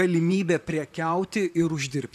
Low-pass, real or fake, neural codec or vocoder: 14.4 kHz; fake; vocoder, 44.1 kHz, 128 mel bands, Pupu-Vocoder